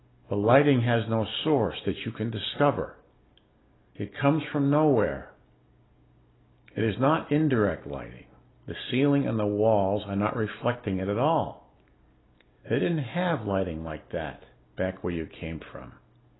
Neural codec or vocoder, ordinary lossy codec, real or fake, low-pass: none; AAC, 16 kbps; real; 7.2 kHz